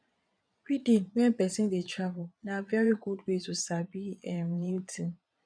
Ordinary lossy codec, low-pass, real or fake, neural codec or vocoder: none; 9.9 kHz; fake; vocoder, 22.05 kHz, 80 mel bands, Vocos